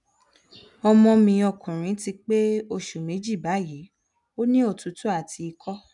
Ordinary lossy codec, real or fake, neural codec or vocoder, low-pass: none; real; none; 10.8 kHz